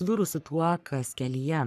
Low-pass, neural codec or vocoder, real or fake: 14.4 kHz; codec, 44.1 kHz, 3.4 kbps, Pupu-Codec; fake